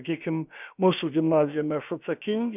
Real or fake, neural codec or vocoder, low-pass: fake; codec, 16 kHz, about 1 kbps, DyCAST, with the encoder's durations; 3.6 kHz